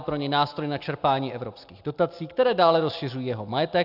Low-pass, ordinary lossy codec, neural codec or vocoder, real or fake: 5.4 kHz; AAC, 48 kbps; none; real